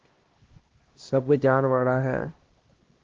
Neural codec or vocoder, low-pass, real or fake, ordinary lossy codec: codec, 16 kHz, 1 kbps, X-Codec, HuBERT features, trained on LibriSpeech; 7.2 kHz; fake; Opus, 16 kbps